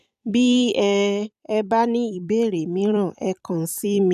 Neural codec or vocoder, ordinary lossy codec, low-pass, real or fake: vocoder, 44.1 kHz, 128 mel bands every 256 samples, BigVGAN v2; none; 14.4 kHz; fake